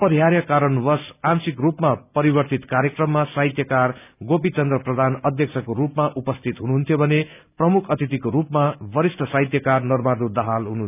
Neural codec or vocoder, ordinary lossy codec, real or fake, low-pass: none; none; real; 3.6 kHz